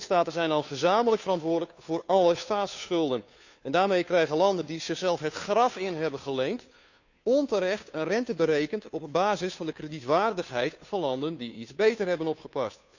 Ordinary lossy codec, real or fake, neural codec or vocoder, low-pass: none; fake; codec, 16 kHz, 2 kbps, FunCodec, trained on Chinese and English, 25 frames a second; 7.2 kHz